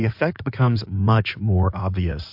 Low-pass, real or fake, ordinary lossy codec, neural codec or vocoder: 5.4 kHz; fake; MP3, 48 kbps; codec, 16 kHz in and 24 kHz out, 2.2 kbps, FireRedTTS-2 codec